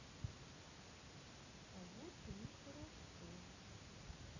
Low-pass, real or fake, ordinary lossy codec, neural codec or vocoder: 7.2 kHz; real; none; none